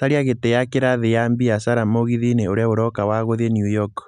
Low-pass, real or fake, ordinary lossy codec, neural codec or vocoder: 10.8 kHz; real; none; none